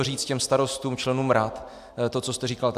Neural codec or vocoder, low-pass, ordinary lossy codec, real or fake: none; 14.4 kHz; AAC, 96 kbps; real